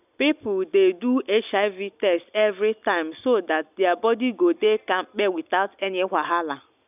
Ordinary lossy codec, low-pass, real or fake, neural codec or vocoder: none; 3.6 kHz; real; none